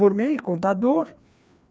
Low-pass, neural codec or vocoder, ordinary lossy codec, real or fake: none; codec, 16 kHz, 2 kbps, FreqCodec, larger model; none; fake